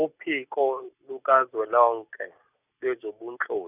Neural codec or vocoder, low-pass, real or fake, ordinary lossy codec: none; 3.6 kHz; real; none